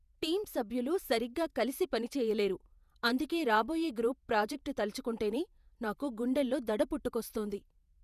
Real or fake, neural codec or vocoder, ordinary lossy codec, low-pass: fake; vocoder, 48 kHz, 128 mel bands, Vocos; none; 14.4 kHz